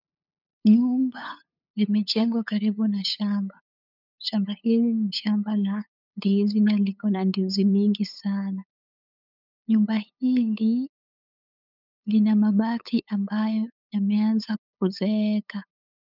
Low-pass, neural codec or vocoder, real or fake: 5.4 kHz; codec, 16 kHz, 8 kbps, FunCodec, trained on LibriTTS, 25 frames a second; fake